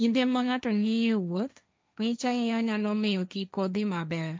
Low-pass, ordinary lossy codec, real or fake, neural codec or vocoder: none; none; fake; codec, 16 kHz, 1.1 kbps, Voila-Tokenizer